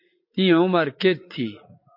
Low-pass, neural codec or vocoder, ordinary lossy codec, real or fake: 5.4 kHz; vocoder, 44.1 kHz, 80 mel bands, Vocos; MP3, 32 kbps; fake